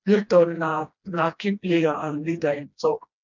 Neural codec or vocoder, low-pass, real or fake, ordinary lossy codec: codec, 16 kHz, 1 kbps, FreqCodec, smaller model; 7.2 kHz; fake; none